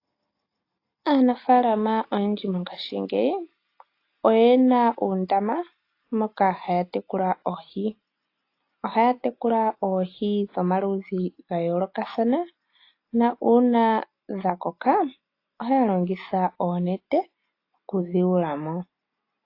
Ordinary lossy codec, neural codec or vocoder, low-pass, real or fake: AAC, 32 kbps; none; 5.4 kHz; real